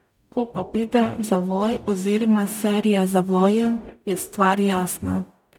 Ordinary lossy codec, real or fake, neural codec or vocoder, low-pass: none; fake; codec, 44.1 kHz, 0.9 kbps, DAC; 19.8 kHz